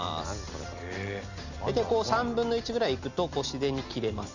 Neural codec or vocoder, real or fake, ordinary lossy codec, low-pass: none; real; none; 7.2 kHz